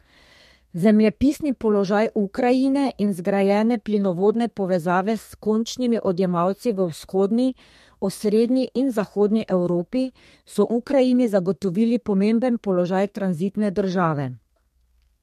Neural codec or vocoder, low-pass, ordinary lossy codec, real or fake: codec, 32 kHz, 1.9 kbps, SNAC; 14.4 kHz; MP3, 64 kbps; fake